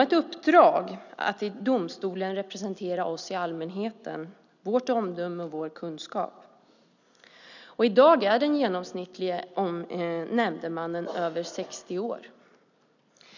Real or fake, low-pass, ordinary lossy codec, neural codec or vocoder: real; 7.2 kHz; none; none